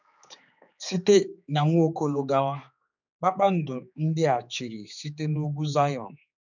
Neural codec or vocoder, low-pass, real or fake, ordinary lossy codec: codec, 16 kHz, 4 kbps, X-Codec, HuBERT features, trained on general audio; 7.2 kHz; fake; none